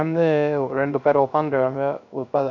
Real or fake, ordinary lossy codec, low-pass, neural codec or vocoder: fake; none; 7.2 kHz; codec, 16 kHz, 0.3 kbps, FocalCodec